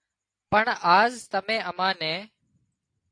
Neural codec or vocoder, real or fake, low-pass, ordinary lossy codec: none; real; 9.9 kHz; AAC, 48 kbps